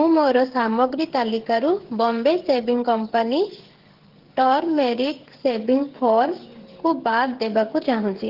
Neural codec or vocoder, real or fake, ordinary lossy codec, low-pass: codec, 16 kHz, 8 kbps, FreqCodec, smaller model; fake; Opus, 16 kbps; 5.4 kHz